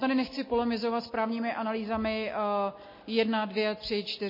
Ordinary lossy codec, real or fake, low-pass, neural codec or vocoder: MP3, 24 kbps; real; 5.4 kHz; none